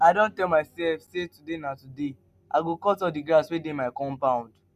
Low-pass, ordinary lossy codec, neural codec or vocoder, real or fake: 14.4 kHz; MP3, 96 kbps; none; real